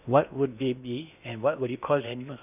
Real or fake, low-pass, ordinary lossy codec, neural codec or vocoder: fake; 3.6 kHz; none; codec, 16 kHz in and 24 kHz out, 0.8 kbps, FocalCodec, streaming, 65536 codes